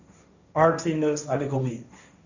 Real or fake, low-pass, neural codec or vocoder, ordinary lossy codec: fake; 7.2 kHz; codec, 16 kHz, 1.1 kbps, Voila-Tokenizer; none